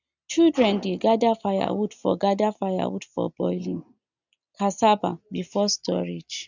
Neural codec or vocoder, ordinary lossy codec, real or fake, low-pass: none; none; real; 7.2 kHz